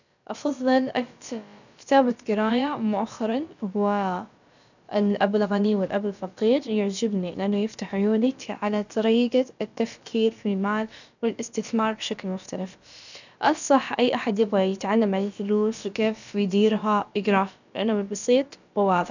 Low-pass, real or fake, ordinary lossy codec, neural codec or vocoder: 7.2 kHz; fake; none; codec, 16 kHz, about 1 kbps, DyCAST, with the encoder's durations